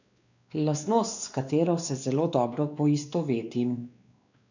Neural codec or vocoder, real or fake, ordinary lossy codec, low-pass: codec, 16 kHz, 2 kbps, X-Codec, WavLM features, trained on Multilingual LibriSpeech; fake; none; 7.2 kHz